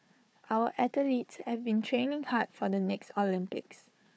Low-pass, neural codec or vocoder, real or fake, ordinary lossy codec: none; codec, 16 kHz, 4 kbps, FunCodec, trained on Chinese and English, 50 frames a second; fake; none